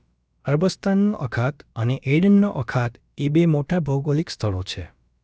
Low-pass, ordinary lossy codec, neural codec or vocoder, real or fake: none; none; codec, 16 kHz, about 1 kbps, DyCAST, with the encoder's durations; fake